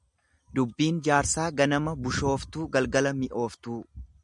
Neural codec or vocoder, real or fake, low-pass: none; real; 10.8 kHz